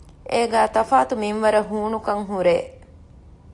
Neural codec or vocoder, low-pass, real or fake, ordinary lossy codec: none; 10.8 kHz; real; AAC, 48 kbps